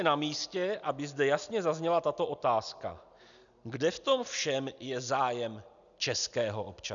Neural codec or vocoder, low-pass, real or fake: none; 7.2 kHz; real